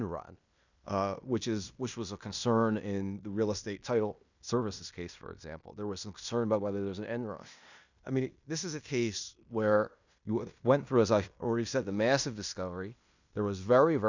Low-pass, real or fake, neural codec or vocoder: 7.2 kHz; fake; codec, 16 kHz in and 24 kHz out, 0.9 kbps, LongCat-Audio-Codec, fine tuned four codebook decoder